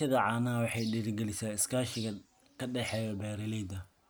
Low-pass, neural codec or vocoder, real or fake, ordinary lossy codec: none; none; real; none